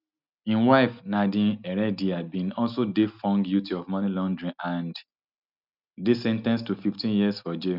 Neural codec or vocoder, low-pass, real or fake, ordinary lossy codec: none; 5.4 kHz; real; none